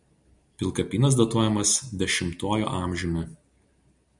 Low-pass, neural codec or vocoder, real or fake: 10.8 kHz; none; real